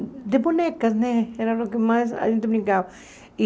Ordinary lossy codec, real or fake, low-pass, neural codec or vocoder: none; real; none; none